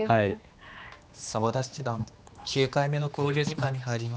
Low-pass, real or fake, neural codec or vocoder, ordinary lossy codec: none; fake; codec, 16 kHz, 2 kbps, X-Codec, HuBERT features, trained on general audio; none